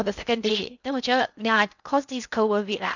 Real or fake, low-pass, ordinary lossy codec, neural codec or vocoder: fake; 7.2 kHz; none; codec, 16 kHz in and 24 kHz out, 0.6 kbps, FocalCodec, streaming, 2048 codes